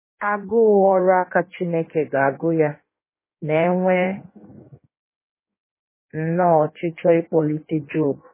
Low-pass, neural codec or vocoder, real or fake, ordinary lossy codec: 3.6 kHz; codec, 16 kHz in and 24 kHz out, 1.1 kbps, FireRedTTS-2 codec; fake; MP3, 16 kbps